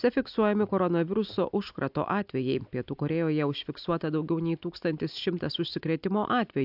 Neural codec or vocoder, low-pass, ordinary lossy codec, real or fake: none; 5.4 kHz; AAC, 48 kbps; real